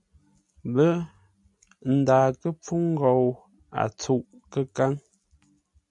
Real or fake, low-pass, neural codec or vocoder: real; 10.8 kHz; none